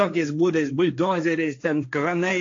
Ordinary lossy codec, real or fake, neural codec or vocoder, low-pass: AAC, 48 kbps; fake; codec, 16 kHz, 1.1 kbps, Voila-Tokenizer; 7.2 kHz